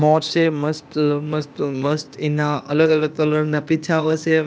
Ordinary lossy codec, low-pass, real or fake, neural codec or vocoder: none; none; fake; codec, 16 kHz, 0.8 kbps, ZipCodec